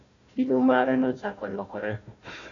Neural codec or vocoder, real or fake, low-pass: codec, 16 kHz, 1 kbps, FunCodec, trained on Chinese and English, 50 frames a second; fake; 7.2 kHz